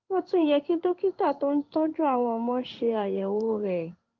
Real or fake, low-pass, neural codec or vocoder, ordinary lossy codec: fake; 7.2 kHz; codec, 16 kHz in and 24 kHz out, 1 kbps, XY-Tokenizer; Opus, 24 kbps